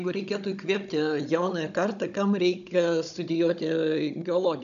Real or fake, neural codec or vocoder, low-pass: fake; codec, 16 kHz, 16 kbps, FunCodec, trained on Chinese and English, 50 frames a second; 7.2 kHz